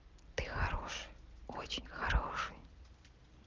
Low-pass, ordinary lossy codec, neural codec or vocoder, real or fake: 7.2 kHz; Opus, 32 kbps; none; real